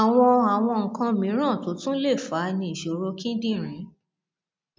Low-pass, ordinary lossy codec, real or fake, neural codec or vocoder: none; none; real; none